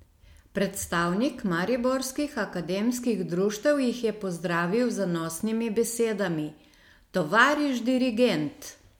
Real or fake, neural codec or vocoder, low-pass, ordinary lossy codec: real; none; 19.8 kHz; MP3, 96 kbps